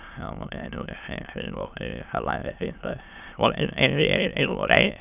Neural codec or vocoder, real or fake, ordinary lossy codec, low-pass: autoencoder, 22.05 kHz, a latent of 192 numbers a frame, VITS, trained on many speakers; fake; none; 3.6 kHz